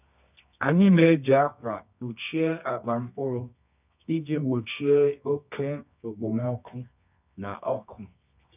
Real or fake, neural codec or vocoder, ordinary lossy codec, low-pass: fake; codec, 24 kHz, 0.9 kbps, WavTokenizer, medium music audio release; none; 3.6 kHz